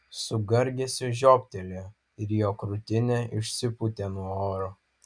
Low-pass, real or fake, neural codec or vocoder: 9.9 kHz; real; none